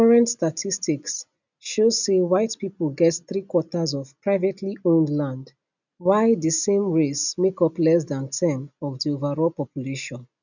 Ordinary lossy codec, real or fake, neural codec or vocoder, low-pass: none; real; none; 7.2 kHz